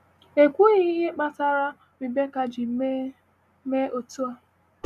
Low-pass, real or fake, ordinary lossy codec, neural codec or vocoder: 14.4 kHz; real; none; none